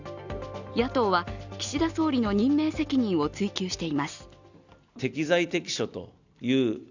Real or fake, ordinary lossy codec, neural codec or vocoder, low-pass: real; none; none; 7.2 kHz